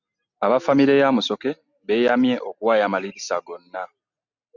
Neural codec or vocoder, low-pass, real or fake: none; 7.2 kHz; real